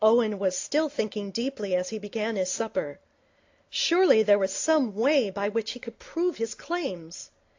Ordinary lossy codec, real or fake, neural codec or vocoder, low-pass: AAC, 48 kbps; real; none; 7.2 kHz